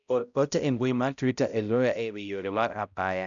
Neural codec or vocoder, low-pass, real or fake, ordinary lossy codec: codec, 16 kHz, 0.5 kbps, X-Codec, HuBERT features, trained on balanced general audio; 7.2 kHz; fake; none